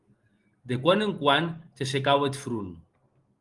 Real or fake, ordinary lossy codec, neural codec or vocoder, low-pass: real; Opus, 24 kbps; none; 10.8 kHz